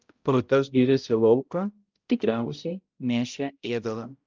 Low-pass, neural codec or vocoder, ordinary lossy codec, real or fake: 7.2 kHz; codec, 16 kHz, 0.5 kbps, X-Codec, HuBERT features, trained on balanced general audio; Opus, 32 kbps; fake